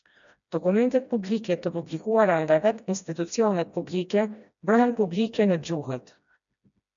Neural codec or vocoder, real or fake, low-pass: codec, 16 kHz, 1 kbps, FreqCodec, smaller model; fake; 7.2 kHz